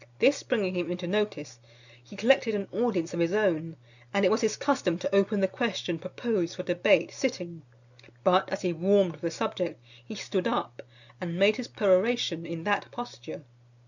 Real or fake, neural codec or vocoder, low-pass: real; none; 7.2 kHz